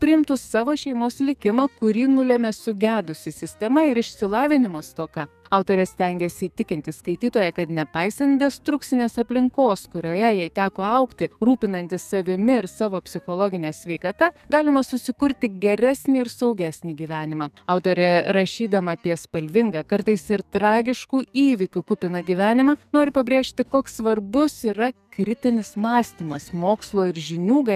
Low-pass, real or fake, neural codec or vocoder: 14.4 kHz; fake; codec, 44.1 kHz, 2.6 kbps, SNAC